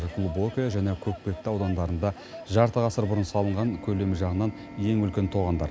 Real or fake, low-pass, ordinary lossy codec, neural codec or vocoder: real; none; none; none